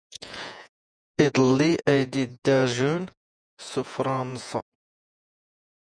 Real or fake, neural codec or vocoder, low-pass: fake; vocoder, 48 kHz, 128 mel bands, Vocos; 9.9 kHz